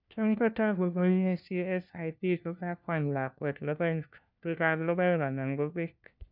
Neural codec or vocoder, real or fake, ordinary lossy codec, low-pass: codec, 16 kHz, 1 kbps, FunCodec, trained on LibriTTS, 50 frames a second; fake; none; 5.4 kHz